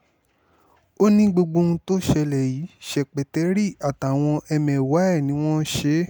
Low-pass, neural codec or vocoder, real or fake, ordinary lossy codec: none; none; real; none